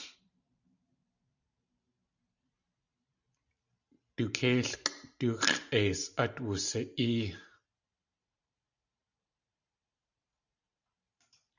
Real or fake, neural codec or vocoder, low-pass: fake; vocoder, 44.1 kHz, 128 mel bands every 512 samples, BigVGAN v2; 7.2 kHz